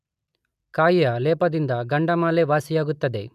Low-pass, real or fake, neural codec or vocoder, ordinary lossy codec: 14.4 kHz; real; none; none